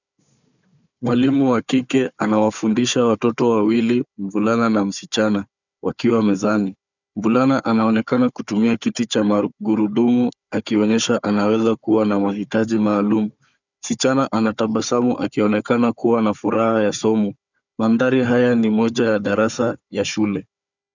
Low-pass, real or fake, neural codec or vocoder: 7.2 kHz; fake; codec, 16 kHz, 4 kbps, FunCodec, trained on Chinese and English, 50 frames a second